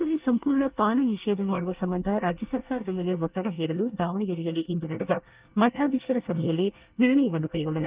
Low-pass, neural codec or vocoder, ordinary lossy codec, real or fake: 3.6 kHz; codec, 24 kHz, 1 kbps, SNAC; Opus, 32 kbps; fake